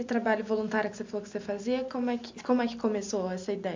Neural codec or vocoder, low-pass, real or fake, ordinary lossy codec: none; 7.2 kHz; real; MP3, 64 kbps